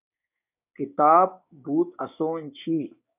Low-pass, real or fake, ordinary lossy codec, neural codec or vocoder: 3.6 kHz; fake; AAC, 32 kbps; codec, 16 kHz, 6 kbps, DAC